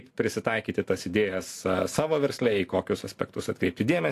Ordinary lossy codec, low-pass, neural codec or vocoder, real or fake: AAC, 64 kbps; 14.4 kHz; none; real